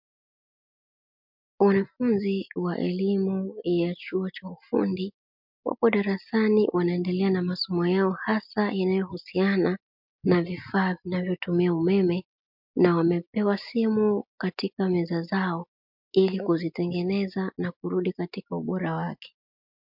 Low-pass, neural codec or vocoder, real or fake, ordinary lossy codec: 5.4 kHz; none; real; MP3, 48 kbps